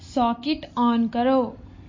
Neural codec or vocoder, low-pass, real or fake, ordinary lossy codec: none; 7.2 kHz; real; MP3, 32 kbps